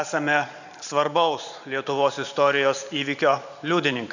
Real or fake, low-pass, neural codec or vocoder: fake; 7.2 kHz; vocoder, 44.1 kHz, 128 mel bands every 512 samples, BigVGAN v2